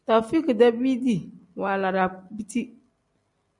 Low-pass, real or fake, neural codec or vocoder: 10.8 kHz; real; none